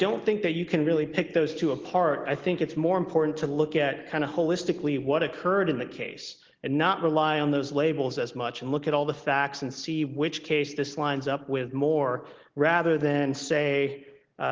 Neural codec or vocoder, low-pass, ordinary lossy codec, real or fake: none; 7.2 kHz; Opus, 16 kbps; real